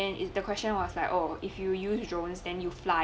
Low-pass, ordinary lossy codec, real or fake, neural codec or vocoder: none; none; real; none